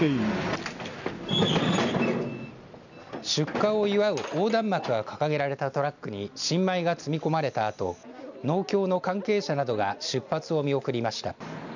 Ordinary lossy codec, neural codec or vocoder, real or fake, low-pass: none; none; real; 7.2 kHz